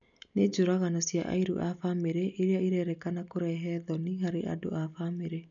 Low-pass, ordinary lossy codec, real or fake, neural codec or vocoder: 7.2 kHz; none; real; none